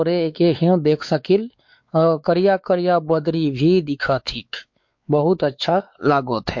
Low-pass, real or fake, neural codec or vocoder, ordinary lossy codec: 7.2 kHz; fake; codec, 16 kHz, 4 kbps, X-Codec, WavLM features, trained on Multilingual LibriSpeech; MP3, 48 kbps